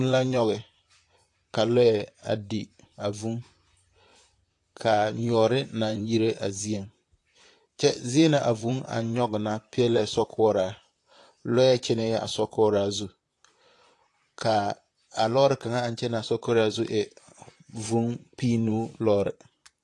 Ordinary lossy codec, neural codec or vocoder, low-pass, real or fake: AAC, 48 kbps; vocoder, 44.1 kHz, 128 mel bands, Pupu-Vocoder; 10.8 kHz; fake